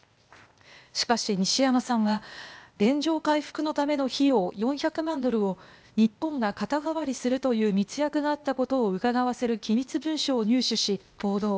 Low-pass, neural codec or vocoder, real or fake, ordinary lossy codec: none; codec, 16 kHz, 0.8 kbps, ZipCodec; fake; none